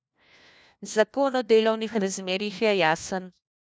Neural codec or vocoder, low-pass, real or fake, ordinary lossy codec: codec, 16 kHz, 1 kbps, FunCodec, trained on LibriTTS, 50 frames a second; none; fake; none